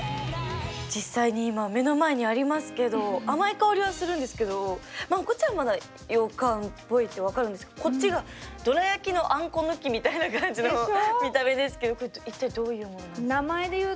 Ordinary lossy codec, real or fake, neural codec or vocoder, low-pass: none; real; none; none